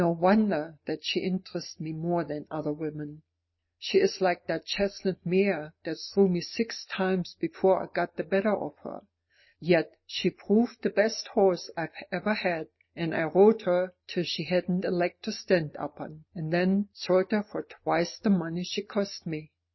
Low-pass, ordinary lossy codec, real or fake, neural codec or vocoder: 7.2 kHz; MP3, 24 kbps; real; none